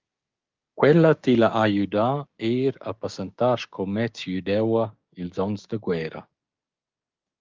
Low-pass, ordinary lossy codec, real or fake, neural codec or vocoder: 7.2 kHz; Opus, 32 kbps; fake; codec, 16 kHz, 6 kbps, DAC